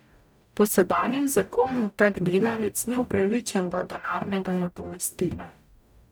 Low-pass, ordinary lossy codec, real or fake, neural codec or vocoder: none; none; fake; codec, 44.1 kHz, 0.9 kbps, DAC